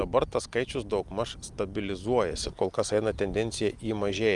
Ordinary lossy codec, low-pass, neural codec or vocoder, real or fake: Opus, 32 kbps; 10.8 kHz; none; real